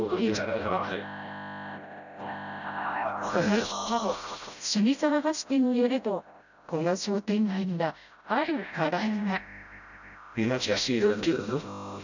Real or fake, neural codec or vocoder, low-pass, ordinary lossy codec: fake; codec, 16 kHz, 0.5 kbps, FreqCodec, smaller model; 7.2 kHz; none